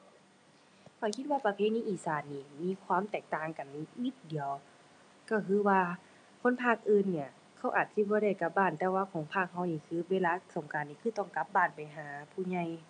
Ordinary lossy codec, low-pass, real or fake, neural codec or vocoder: none; 9.9 kHz; real; none